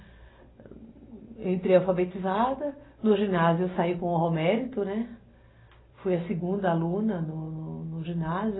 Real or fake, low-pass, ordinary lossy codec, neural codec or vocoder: real; 7.2 kHz; AAC, 16 kbps; none